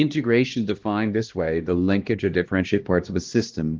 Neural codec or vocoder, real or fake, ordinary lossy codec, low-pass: codec, 16 kHz, 1 kbps, X-Codec, HuBERT features, trained on LibriSpeech; fake; Opus, 16 kbps; 7.2 kHz